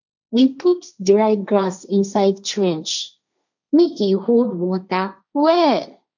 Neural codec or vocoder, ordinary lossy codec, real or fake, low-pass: codec, 16 kHz, 1.1 kbps, Voila-Tokenizer; none; fake; 7.2 kHz